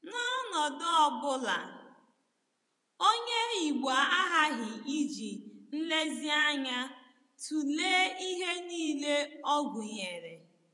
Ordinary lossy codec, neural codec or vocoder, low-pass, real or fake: none; vocoder, 24 kHz, 100 mel bands, Vocos; 10.8 kHz; fake